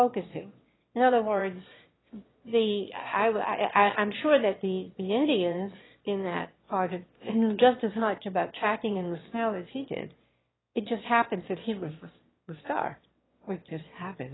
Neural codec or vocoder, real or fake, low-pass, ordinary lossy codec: autoencoder, 22.05 kHz, a latent of 192 numbers a frame, VITS, trained on one speaker; fake; 7.2 kHz; AAC, 16 kbps